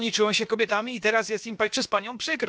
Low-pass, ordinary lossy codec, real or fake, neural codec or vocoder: none; none; fake; codec, 16 kHz, about 1 kbps, DyCAST, with the encoder's durations